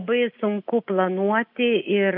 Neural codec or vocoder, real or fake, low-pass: none; real; 5.4 kHz